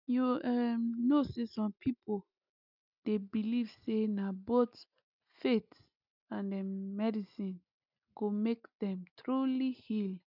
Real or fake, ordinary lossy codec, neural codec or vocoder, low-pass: real; none; none; 5.4 kHz